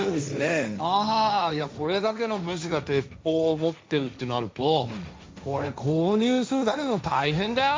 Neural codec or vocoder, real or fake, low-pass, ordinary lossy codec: codec, 16 kHz, 1.1 kbps, Voila-Tokenizer; fake; none; none